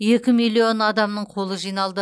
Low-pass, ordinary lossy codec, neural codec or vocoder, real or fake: none; none; none; real